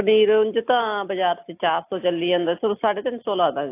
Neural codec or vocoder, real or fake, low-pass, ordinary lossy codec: none; real; 3.6 kHz; AAC, 24 kbps